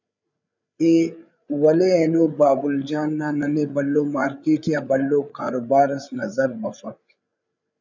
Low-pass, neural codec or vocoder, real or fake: 7.2 kHz; codec, 16 kHz, 8 kbps, FreqCodec, larger model; fake